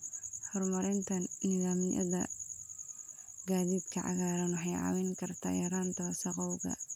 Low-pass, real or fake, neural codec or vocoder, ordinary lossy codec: 19.8 kHz; real; none; none